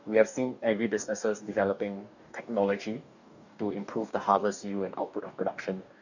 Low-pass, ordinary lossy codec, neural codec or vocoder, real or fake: 7.2 kHz; none; codec, 44.1 kHz, 2.6 kbps, DAC; fake